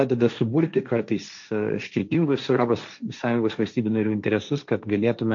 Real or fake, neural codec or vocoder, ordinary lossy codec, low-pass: fake; codec, 16 kHz, 1.1 kbps, Voila-Tokenizer; MP3, 48 kbps; 7.2 kHz